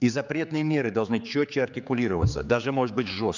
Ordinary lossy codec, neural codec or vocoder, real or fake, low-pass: none; codec, 16 kHz, 4 kbps, X-Codec, HuBERT features, trained on general audio; fake; 7.2 kHz